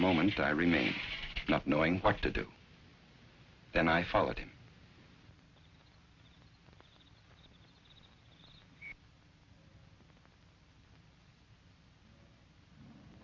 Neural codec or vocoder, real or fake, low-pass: none; real; 7.2 kHz